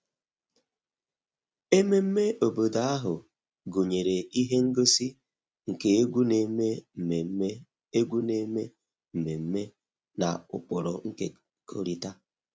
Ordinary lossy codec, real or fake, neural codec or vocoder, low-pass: none; real; none; none